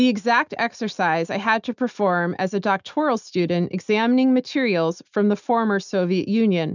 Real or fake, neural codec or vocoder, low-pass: real; none; 7.2 kHz